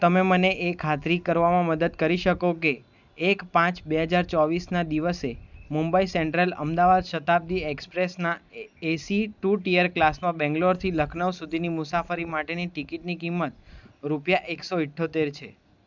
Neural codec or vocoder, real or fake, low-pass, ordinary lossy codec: none; real; 7.2 kHz; none